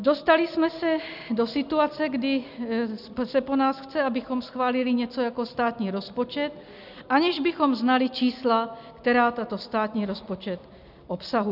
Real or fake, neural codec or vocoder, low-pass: real; none; 5.4 kHz